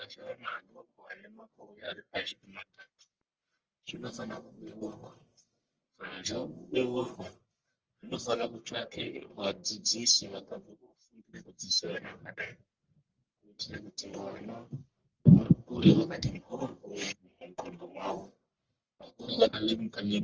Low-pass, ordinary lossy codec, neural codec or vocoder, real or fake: 7.2 kHz; Opus, 24 kbps; codec, 44.1 kHz, 1.7 kbps, Pupu-Codec; fake